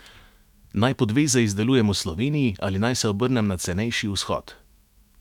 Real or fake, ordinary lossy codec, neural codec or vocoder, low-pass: fake; none; autoencoder, 48 kHz, 128 numbers a frame, DAC-VAE, trained on Japanese speech; 19.8 kHz